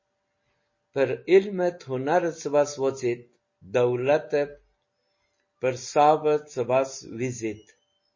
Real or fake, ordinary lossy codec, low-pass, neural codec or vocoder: real; MP3, 32 kbps; 7.2 kHz; none